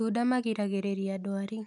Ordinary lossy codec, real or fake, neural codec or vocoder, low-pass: none; real; none; 10.8 kHz